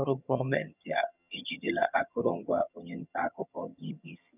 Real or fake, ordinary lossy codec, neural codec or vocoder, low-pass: fake; none; vocoder, 22.05 kHz, 80 mel bands, HiFi-GAN; 3.6 kHz